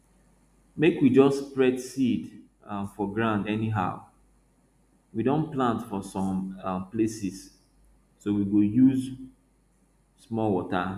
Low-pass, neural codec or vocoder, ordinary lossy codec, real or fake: 14.4 kHz; none; none; real